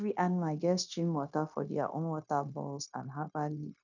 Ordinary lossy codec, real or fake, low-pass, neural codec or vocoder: none; fake; 7.2 kHz; codec, 16 kHz, 0.9 kbps, LongCat-Audio-Codec